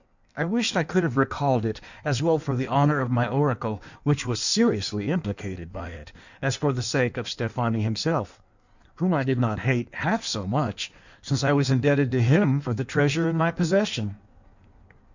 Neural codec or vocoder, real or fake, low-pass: codec, 16 kHz in and 24 kHz out, 1.1 kbps, FireRedTTS-2 codec; fake; 7.2 kHz